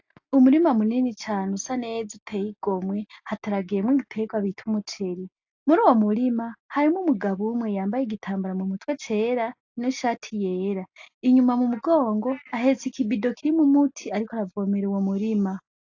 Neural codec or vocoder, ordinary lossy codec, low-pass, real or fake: none; AAC, 48 kbps; 7.2 kHz; real